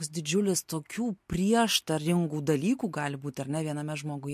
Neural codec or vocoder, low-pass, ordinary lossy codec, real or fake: none; 14.4 kHz; MP3, 64 kbps; real